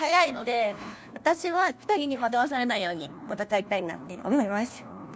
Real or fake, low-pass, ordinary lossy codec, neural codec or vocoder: fake; none; none; codec, 16 kHz, 1 kbps, FunCodec, trained on LibriTTS, 50 frames a second